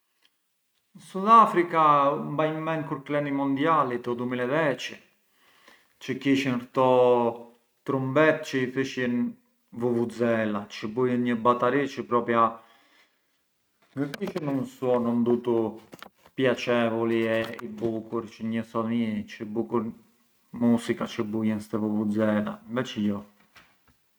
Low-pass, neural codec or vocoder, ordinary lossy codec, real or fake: none; none; none; real